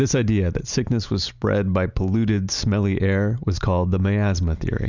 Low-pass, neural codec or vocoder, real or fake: 7.2 kHz; none; real